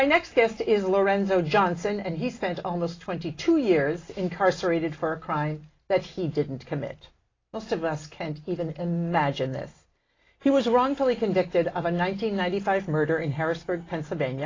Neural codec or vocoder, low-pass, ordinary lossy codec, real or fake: none; 7.2 kHz; AAC, 32 kbps; real